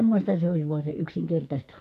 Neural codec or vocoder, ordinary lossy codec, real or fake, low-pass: codec, 44.1 kHz, 2.6 kbps, SNAC; AAC, 64 kbps; fake; 14.4 kHz